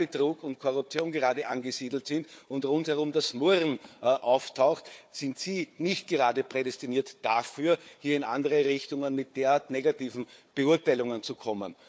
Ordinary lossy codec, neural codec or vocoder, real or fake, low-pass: none; codec, 16 kHz, 4 kbps, FunCodec, trained on Chinese and English, 50 frames a second; fake; none